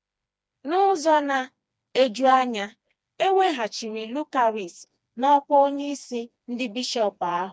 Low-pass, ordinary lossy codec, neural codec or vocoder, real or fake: none; none; codec, 16 kHz, 2 kbps, FreqCodec, smaller model; fake